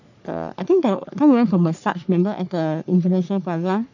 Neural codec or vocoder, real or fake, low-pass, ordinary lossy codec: codec, 44.1 kHz, 3.4 kbps, Pupu-Codec; fake; 7.2 kHz; none